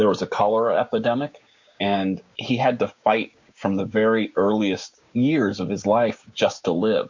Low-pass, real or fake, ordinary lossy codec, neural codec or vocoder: 7.2 kHz; fake; MP3, 48 kbps; codec, 16 kHz, 6 kbps, DAC